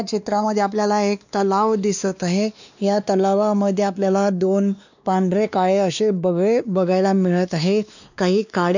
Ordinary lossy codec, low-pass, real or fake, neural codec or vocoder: none; 7.2 kHz; fake; codec, 16 kHz, 2 kbps, X-Codec, WavLM features, trained on Multilingual LibriSpeech